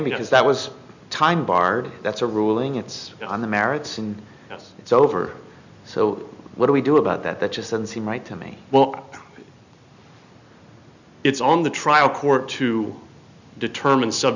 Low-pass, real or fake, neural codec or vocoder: 7.2 kHz; real; none